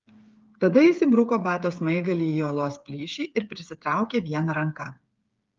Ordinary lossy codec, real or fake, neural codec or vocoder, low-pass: Opus, 32 kbps; fake; codec, 16 kHz, 8 kbps, FreqCodec, smaller model; 7.2 kHz